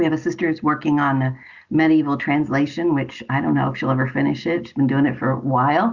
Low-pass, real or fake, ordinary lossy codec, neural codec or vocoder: 7.2 kHz; real; Opus, 64 kbps; none